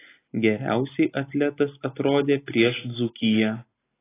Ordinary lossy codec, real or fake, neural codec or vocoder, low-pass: AAC, 16 kbps; real; none; 3.6 kHz